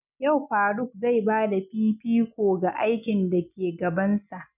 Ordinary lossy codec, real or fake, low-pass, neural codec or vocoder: none; real; 3.6 kHz; none